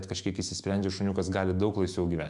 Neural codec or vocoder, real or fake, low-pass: autoencoder, 48 kHz, 128 numbers a frame, DAC-VAE, trained on Japanese speech; fake; 10.8 kHz